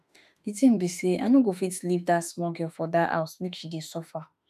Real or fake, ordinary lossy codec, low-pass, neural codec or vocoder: fake; none; 14.4 kHz; autoencoder, 48 kHz, 32 numbers a frame, DAC-VAE, trained on Japanese speech